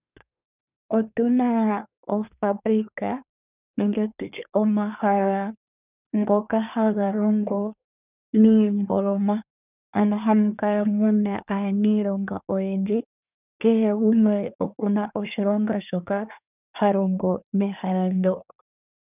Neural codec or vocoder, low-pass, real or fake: codec, 24 kHz, 1 kbps, SNAC; 3.6 kHz; fake